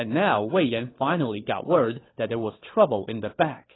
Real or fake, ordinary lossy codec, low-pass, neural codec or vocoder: fake; AAC, 16 kbps; 7.2 kHz; codec, 16 kHz, 4 kbps, FunCodec, trained on LibriTTS, 50 frames a second